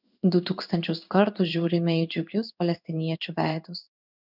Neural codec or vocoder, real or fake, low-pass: codec, 16 kHz in and 24 kHz out, 1 kbps, XY-Tokenizer; fake; 5.4 kHz